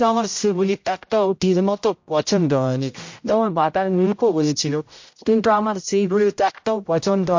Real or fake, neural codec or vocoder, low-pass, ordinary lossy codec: fake; codec, 16 kHz, 0.5 kbps, X-Codec, HuBERT features, trained on general audio; 7.2 kHz; MP3, 48 kbps